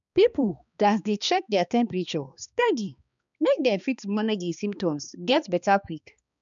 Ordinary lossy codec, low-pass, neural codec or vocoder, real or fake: none; 7.2 kHz; codec, 16 kHz, 2 kbps, X-Codec, HuBERT features, trained on balanced general audio; fake